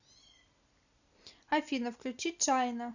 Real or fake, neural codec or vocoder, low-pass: real; none; 7.2 kHz